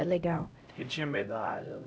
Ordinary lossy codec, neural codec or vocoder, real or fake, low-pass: none; codec, 16 kHz, 0.5 kbps, X-Codec, HuBERT features, trained on LibriSpeech; fake; none